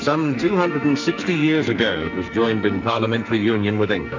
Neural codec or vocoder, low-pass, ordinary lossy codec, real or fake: codec, 44.1 kHz, 2.6 kbps, SNAC; 7.2 kHz; MP3, 64 kbps; fake